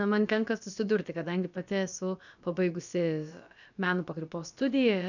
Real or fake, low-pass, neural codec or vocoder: fake; 7.2 kHz; codec, 16 kHz, about 1 kbps, DyCAST, with the encoder's durations